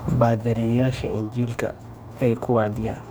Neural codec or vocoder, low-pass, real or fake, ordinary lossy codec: codec, 44.1 kHz, 2.6 kbps, DAC; none; fake; none